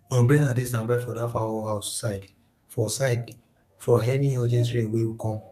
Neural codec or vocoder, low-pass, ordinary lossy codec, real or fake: codec, 32 kHz, 1.9 kbps, SNAC; 14.4 kHz; none; fake